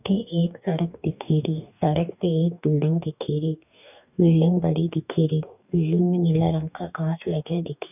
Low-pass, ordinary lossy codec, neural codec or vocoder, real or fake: 3.6 kHz; none; codec, 44.1 kHz, 2.6 kbps, DAC; fake